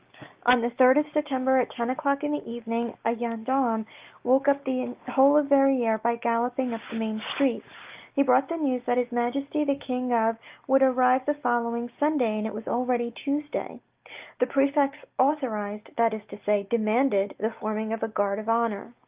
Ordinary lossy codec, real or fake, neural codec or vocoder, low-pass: Opus, 32 kbps; real; none; 3.6 kHz